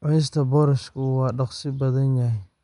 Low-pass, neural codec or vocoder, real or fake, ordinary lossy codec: 10.8 kHz; none; real; none